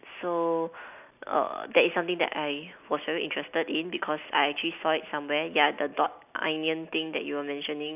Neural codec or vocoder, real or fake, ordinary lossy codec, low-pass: none; real; none; 3.6 kHz